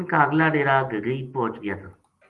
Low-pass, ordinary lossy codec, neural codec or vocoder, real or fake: 10.8 kHz; Opus, 24 kbps; none; real